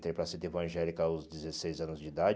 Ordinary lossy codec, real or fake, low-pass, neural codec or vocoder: none; real; none; none